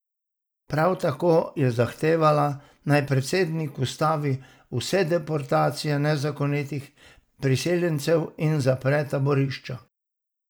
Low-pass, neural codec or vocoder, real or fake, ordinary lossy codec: none; none; real; none